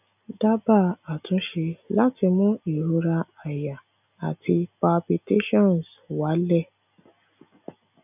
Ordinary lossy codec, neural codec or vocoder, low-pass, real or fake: none; none; 3.6 kHz; real